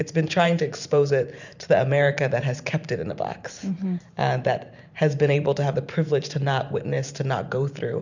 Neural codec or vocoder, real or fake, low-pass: vocoder, 44.1 kHz, 128 mel bands every 512 samples, BigVGAN v2; fake; 7.2 kHz